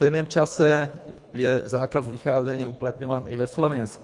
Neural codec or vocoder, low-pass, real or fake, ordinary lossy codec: codec, 24 kHz, 1.5 kbps, HILCodec; 10.8 kHz; fake; Opus, 64 kbps